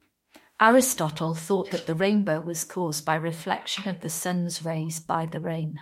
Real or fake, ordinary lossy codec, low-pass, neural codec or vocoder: fake; MP3, 64 kbps; 19.8 kHz; autoencoder, 48 kHz, 32 numbers a frame, DAC-VAE, trained on Japanese speech